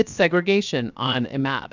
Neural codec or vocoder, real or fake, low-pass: codec, 16 kHz, 0.7 kbps, FocalCodec; fake; 7.2 kHz